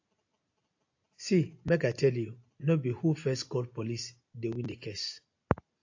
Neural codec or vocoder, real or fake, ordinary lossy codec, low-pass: none; real; AAC, 48 kbps; 7.2 kHz